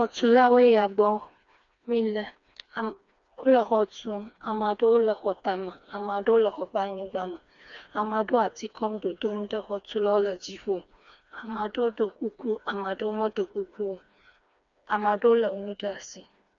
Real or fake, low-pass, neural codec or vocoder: fake; 7.2 kHz; codec, 16 kHz, 2 kbps, FreqCodec, smaller model